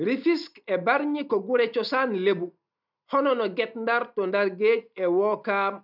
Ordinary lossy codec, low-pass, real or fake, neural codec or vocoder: none; 5.4 kHz; real; none